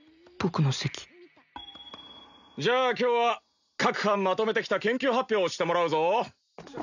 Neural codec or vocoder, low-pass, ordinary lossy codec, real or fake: none; 7.2 kHz; none; real